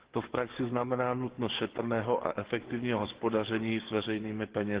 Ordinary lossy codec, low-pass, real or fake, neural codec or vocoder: Opus, 16 kbps; 3.6 kHz; fake; codec, 44.1 kHz, 7.8 kbps, DAC